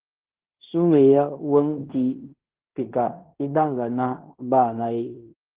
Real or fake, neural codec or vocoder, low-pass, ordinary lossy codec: fake; codec, 16 kHz in and 24 kHz out, 0.9 kbps, LongCat-Audio-Codec, fine tuned four codebook decoder; 3.6 kHz; Opus, 16 kbps